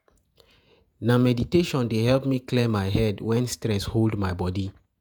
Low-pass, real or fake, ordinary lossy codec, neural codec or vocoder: none; fake; none; vocoder, 48 kHz, 128 mel bands, Vocos